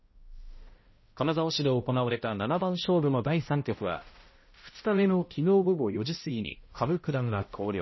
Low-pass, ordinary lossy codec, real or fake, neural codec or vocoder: 7.2 kHz; MP3, 24 kbps; fake; codec, 16 kHz, 0.5 kbps, X-Codec, HuBERT features, trained on balanced general audio